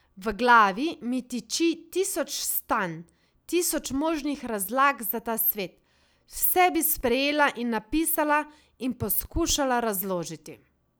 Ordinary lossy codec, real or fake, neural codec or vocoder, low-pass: none; real; none; none